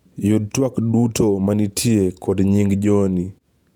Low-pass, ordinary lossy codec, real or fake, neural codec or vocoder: 19.8 kHz; none; fake; vocoder, 48 kHz, 128 mel bands, Vocos